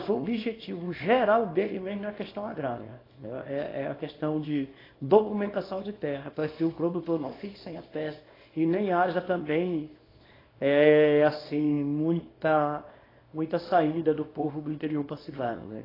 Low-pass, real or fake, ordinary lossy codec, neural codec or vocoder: 5.4 kHz; fake; AAC, 24 kbps; codec, 24 kHz, 0.9 kbps, WavTokenizer, small release